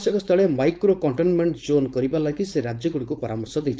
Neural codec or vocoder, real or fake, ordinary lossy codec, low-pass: codec, 16 kHz, 4.8 kbps, FACodec; fake; none; none